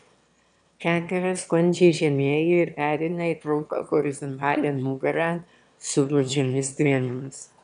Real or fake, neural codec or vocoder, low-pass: fake; autoencoder, 22.05 kHz, a latent of 192 numbers a frame, VITS, trained on one speaker; 9.9 kHz